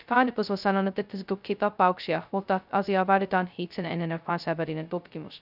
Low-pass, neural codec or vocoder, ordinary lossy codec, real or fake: 5.4 kHz; codec, 16 kHz, 0.2 kbps, FocalCodec; none; fake